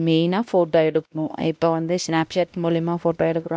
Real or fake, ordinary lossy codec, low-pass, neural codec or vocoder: fake; none; none; codec, 16 kHz, 1 kbps, X-Codec, WavLM features, trained on Multilingual LibriSpeech